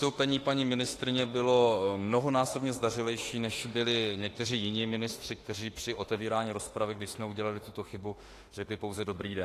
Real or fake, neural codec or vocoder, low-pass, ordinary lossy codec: fake; autoencoder, 48 kHz, 32 numbers a frame, DAC-VAE, trained on Japanese speech; 14.4 kHz; AAC, 48 kbps